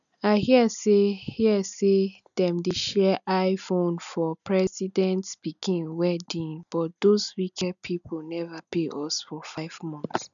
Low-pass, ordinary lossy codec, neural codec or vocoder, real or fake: 7.2 kHz; none; none; real